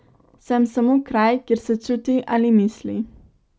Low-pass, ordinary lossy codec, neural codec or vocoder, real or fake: none; none; none; real